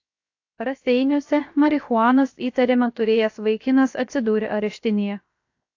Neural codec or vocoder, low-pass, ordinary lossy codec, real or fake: codec, 16 kHz, 0.7 kbps, FocalCodec; 7.2 kHz; AAC, 48 kbps; fake